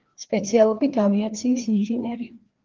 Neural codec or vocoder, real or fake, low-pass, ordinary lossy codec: codec, 16 kHz, 2 kbps, FreqCodec, larger model; fake; 7.2 kHz; Opus, 16 kbps